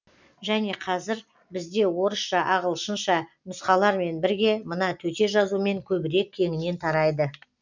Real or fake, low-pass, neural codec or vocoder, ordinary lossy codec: real; 7.2 kHz; none; none